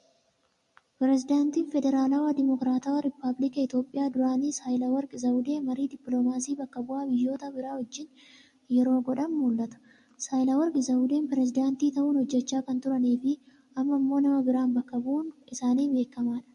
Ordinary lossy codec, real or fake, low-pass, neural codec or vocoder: MP3, 48 kbps; real; 14.4 kHz; none